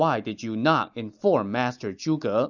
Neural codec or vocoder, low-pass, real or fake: none; 7.2 kHz; real